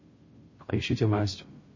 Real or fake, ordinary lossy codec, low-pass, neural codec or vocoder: fake; MP3, 32 kbps; 7.2 kHz; codec, 16 kHz, 0.5 kbps, FunCodec, trained on Chinese and English, 25 frames a second